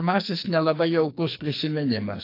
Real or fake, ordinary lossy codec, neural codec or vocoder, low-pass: fake; AAC, 32 kbps; codec, 44.1 kHz, 2.6 kbps, SNAC; 5.4 kHz